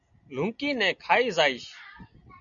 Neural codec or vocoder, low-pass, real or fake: none; 7.2 kHz; real